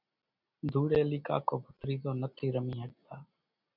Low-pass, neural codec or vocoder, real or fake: 5.4 kHz; none; real